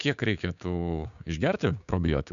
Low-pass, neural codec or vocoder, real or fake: 7.2 kHz; codec, 16 kHz, 6 kbps, DAC; fake